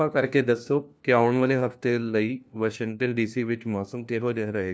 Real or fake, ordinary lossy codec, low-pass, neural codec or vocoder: fake; none; none; codec, 16 kHz, 1 kbps, FunCodec, trained on LibriTTS, 50 frames a second